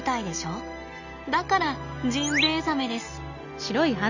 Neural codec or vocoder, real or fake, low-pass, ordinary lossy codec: none; real; 7.2 kHz; none